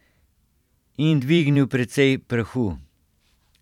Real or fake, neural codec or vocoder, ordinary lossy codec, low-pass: fake; vocoder, 44.1 kHz, 128 mel bands every 512 samples, BigVGAN v2; none; 19.8 kHz